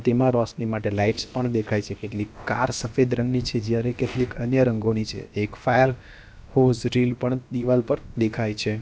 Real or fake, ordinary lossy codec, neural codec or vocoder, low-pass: fake; none; codec, 16 kHz, about 1 kbps, DyCAST, with the encoder's durations; none